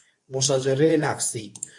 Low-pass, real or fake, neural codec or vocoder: 10.8 kHz; fake; codec, 24 kHz, 0.9 kbps, WavTokenizer, medium speech release version 2